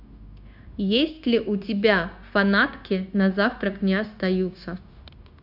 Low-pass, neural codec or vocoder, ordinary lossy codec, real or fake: 5.4 kHz; codec, 16 kHz, 0.9 kbps, LongCat-Audio-Codec; AAC, 48 kbps; fake